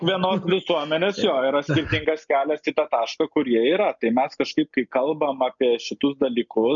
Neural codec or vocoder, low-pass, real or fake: none; 7.2 kHz; real